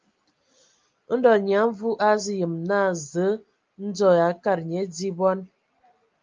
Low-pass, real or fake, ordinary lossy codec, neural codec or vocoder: 7.2 kHz; real; Opus, 24 kbps; none